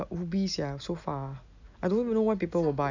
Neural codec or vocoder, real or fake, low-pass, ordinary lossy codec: none; real; 7.2 kHz; none